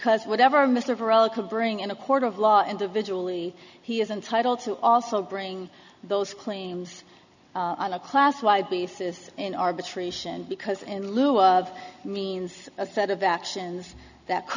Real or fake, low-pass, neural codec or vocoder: real; 7.2 kHz; none